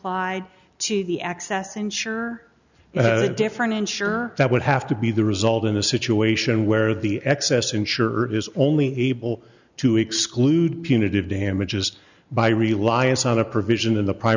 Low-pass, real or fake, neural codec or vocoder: 7.2 kHz; real; none